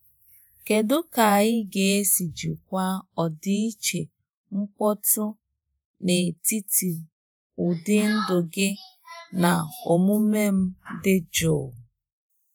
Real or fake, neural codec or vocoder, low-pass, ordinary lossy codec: fake; vocoder, 48 kHz, 128 mel bands, Vocos; none; none